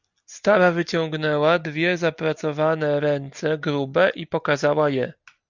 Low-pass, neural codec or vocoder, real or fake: 7.2 kHz; none; real